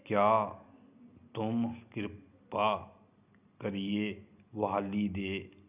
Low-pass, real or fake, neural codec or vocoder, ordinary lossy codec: 3.6 kHz; real; none; none